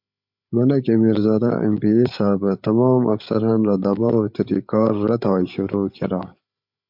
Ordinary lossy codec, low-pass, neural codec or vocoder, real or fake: MP3, 48 kbps; 5.4 kHz; codec, 16 kHz, 8 kbps, FreqCodec, larger model; fake